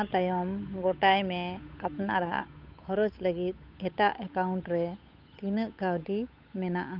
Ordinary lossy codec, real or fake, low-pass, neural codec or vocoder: none; fake; 5.4 kHz; codec, 16 kHz, 8 kbps, FunCodec, trained on Chinese and English, 25 frames a second